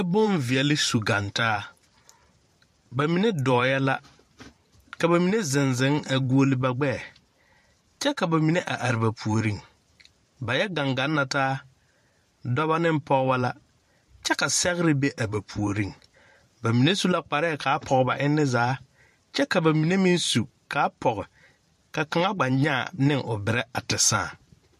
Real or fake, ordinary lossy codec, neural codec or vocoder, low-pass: fake; MP3, 64 kbps; vocoder, 44.1 kHz, 128 mel bands every 512 samples, BigVGAN v2; 14.4 kHz